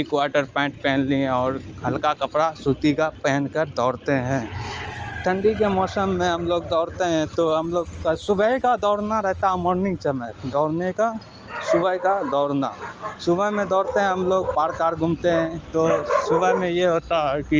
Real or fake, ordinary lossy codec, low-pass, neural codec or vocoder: real; Opus, 24 kbps; 7.2 kHz; none